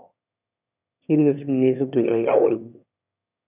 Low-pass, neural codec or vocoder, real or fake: 3.6 kHz; autoencoder, 22.05 kHz, a latent of 192 numbers a frame, VITS, trained on one speaker; fake